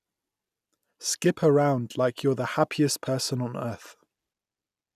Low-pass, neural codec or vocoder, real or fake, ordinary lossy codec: 14.4 kHz; none; real; none